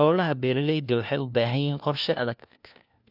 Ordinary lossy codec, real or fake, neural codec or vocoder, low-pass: none; fake; codec, 16 kHz, 1 kbps, FunCodec, trained on LibriTTS, 50 frames a second; 5.4 kHz